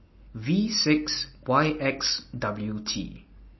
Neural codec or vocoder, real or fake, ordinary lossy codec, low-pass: none; real; MP3, 24 kbps; 7.2 kHz